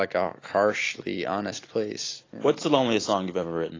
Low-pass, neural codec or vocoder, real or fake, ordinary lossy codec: 7.2 kHz; none; real; AAC, 32 kbps